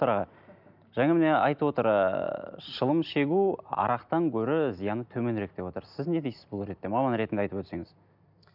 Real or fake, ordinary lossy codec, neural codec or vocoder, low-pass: real; none; none; 5.4 kHz